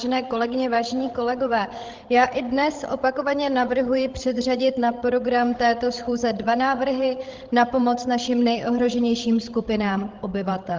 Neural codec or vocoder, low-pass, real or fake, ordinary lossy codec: codec, 16 kHz, 16 kbps, FreqCodec, larger model; 7.2 kHz; fake; Opus, 16 kbps